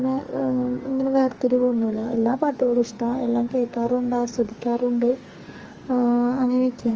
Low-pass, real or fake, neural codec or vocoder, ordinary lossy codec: 7.2 kHz; fake; codec, 44.1 kHz, 2.6 kbps, SNAC; Opus, 24 kbps